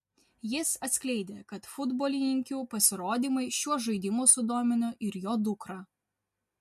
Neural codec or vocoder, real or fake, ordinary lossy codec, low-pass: none; real; MP3, 64 kbps; 14.4 kHz